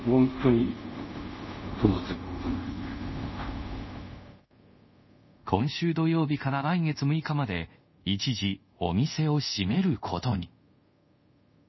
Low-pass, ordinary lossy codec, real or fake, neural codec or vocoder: 7.2 kHz; MP3, 24 kbps; fake; codec, 24 kHz, 0.5 kbps, DualCodec